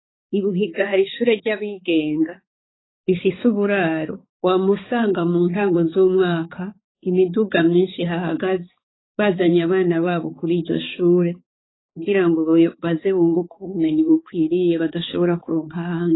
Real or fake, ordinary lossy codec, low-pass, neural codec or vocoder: fake; AAC, 16 kbps; 7.2 kHz; codec, 16 kHz, 4 kbps, X-Codec, HuBERT features, trained on balanced general audio